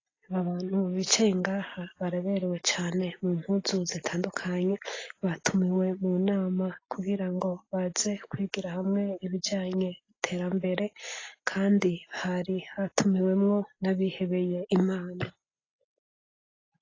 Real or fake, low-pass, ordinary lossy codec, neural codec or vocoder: real; 7.2 kHz; AAC, 32 kbps; none